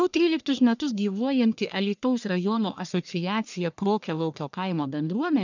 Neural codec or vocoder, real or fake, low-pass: codec, 44.1 kHz, 1.7 kbps, Pupu-Codec; fake; 7.2 kHz